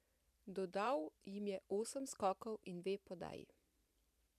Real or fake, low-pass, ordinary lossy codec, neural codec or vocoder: fake; 14.4 kHz; MP3, 96 kbps; vocoder, 44.1 kHz, 128 mel bands every 512 samples, BigVGAN v2